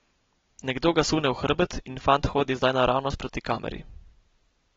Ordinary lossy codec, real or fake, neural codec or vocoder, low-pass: AAC, 24 kbps; real; none; 7.2 kHz